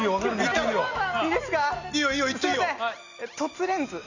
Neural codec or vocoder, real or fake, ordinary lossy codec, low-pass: none; real; none; 7.2 kHz